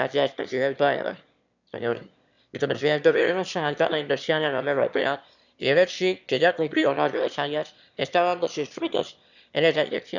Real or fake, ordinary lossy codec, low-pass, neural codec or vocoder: fake; none; 7.2 kHz; autoencoder, 22.05 kHz, a latent of 192 numbers a frame, VITS, trained on one speaker